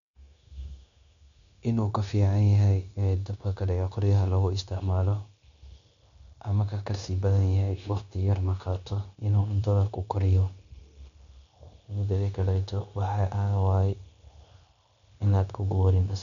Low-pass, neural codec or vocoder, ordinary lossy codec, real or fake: 7.2 kHz; codec, 16 kHz, 0.9 kbps, LongCat-Audio-Codec; none; fake